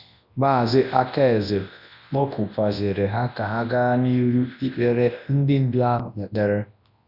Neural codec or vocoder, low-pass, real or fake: codec, 24 kHz, 0.9 kbps, WavTokenizer, large speech release; 5.4 kHz; fake